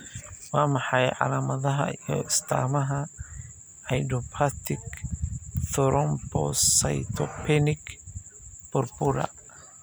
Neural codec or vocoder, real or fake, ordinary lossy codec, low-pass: none; real; none; none